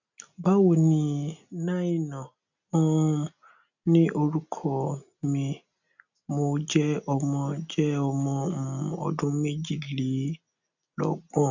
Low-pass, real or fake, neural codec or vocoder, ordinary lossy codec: 7.2 kHz; real; none; none